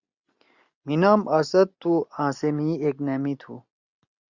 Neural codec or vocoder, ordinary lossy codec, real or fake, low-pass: none; Opus, 64 kbps; real; 7.2 kHz